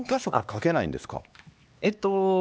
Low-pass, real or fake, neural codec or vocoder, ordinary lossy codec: none; fake; codec, 16 kHz, 2 kbps, X-Codec, HuBERT features, trained on LibriSpeech; none